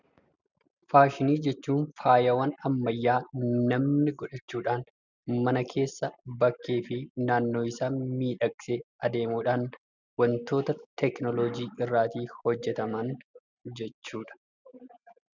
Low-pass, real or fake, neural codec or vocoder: 7.2 kHz; real; none